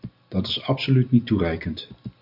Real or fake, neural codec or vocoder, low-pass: real; none; 5.4 kHz